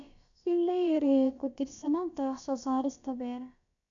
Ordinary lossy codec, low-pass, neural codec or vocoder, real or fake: MP3, 96 kbps; 7.2 kHz; codec, 16 kHz, about 1 kbps, DyCAST, with the encoder's durations; fake